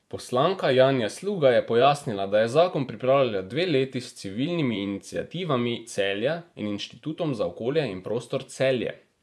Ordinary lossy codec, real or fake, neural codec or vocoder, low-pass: none; fake; vocoder, 24 kHz, 100 mel bands, Vocos; none